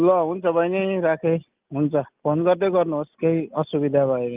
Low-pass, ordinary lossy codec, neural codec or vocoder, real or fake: 3.6 kHz; Opus, 24 kbps; none; real